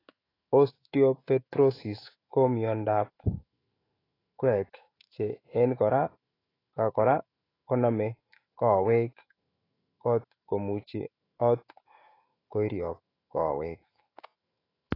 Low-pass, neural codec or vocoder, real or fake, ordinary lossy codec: 5.4 kHz; autoencoder, 48 kHz, 128 numbers a frame, DAC-VAE, trained on Japanese speech; fake; AAC, 24 kbps